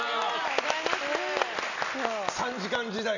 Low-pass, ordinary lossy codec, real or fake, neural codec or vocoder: 7.2 kHz; none; real; none